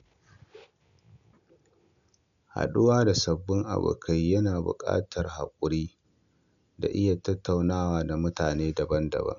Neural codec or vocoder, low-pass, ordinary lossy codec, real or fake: none; 7.2 kHz; none; real